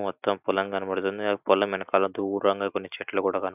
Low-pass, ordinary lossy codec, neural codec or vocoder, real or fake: 3.6 kHz; none; none; real